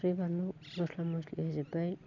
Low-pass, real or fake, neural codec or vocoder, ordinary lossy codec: 7.2 kHz; real; none; none